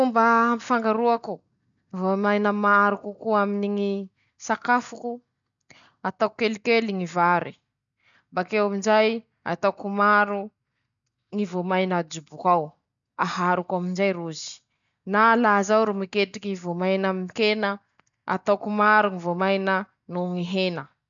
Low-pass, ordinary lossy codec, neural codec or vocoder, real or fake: 7.2 kHz; none; none; real